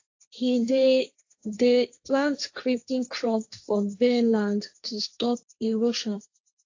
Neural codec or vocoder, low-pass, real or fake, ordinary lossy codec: codec, 16 kHz, 1.1 kbps, Voila-Tokenizer; 7.2 kHz; fake; AAC, 48 kbps